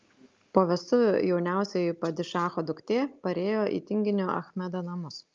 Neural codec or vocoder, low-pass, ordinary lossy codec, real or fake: none; 7.2 kHz; Opus, 24 kbps; real